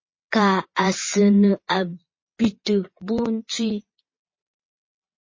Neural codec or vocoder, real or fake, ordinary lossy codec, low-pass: vocoder, 22.05 kHz, 80 mel bands, Vocos; fake; MP3, 32 kbps; 7.2 kHz